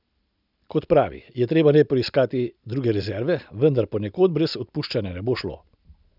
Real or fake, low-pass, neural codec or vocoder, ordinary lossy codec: real; 5.4 kHz; none; none